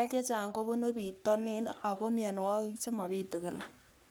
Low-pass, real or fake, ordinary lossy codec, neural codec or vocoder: none; fake; none; codec, 44.1 kHz, 3.4 kbps, Pupu-Codec